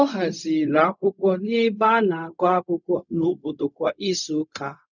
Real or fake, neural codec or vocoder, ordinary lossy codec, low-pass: fake; codec, 16 kHz, 0.4 kbps, LongCat-Audio-Codec; none; 7.2 kHz